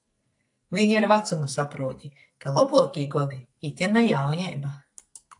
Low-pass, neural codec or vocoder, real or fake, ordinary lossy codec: 10.8 kHz; codec, 44.1 kHz, 2.6 kbps, SNAC; fake; AAC, 64 kbps